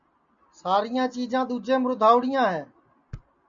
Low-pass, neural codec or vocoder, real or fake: 7.2 kHz; none; real